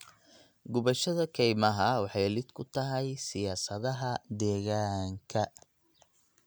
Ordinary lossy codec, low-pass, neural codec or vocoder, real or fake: none; none; none; real